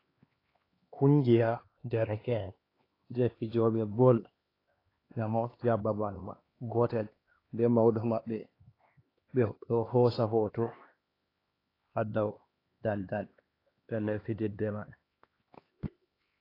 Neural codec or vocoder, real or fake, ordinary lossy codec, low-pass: codec, 16 kHz, 2 kbps, X-Codec, HuBERT features, trained on LibriSpeech; fake; AAC, 24 kbps; 5.4 kHz